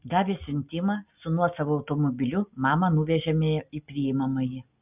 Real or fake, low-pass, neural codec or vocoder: real; 3.6 kHz; none